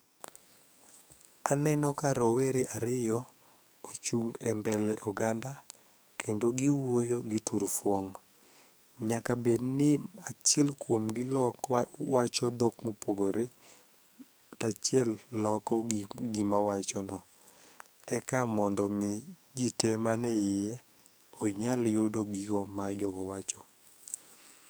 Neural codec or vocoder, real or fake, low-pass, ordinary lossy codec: codec, 44.1 kHz, 2.6 kbps, SNAC; fake; none; none